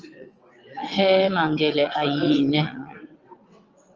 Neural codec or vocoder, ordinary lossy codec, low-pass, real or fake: vocoder, 22.05 kHz, 80 mel bands, WaveNeXt; Opus, 24 kbps; 7.2 kHz; fake